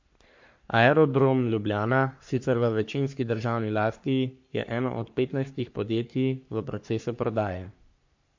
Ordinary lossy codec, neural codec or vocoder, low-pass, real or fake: MP3, 48 kbps; codec, 44.1 kHz, 3.4 kbps, Pupu-Codec; 7.2 kHz; fake